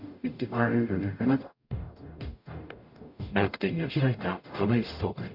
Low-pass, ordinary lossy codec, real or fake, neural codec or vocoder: 5.4 kHz; none; fake; codec, 44.1 kHz, 0.9 kbps, DAC